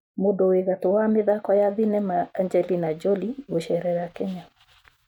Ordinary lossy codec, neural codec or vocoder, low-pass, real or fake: none; none; 19.8 kHz; real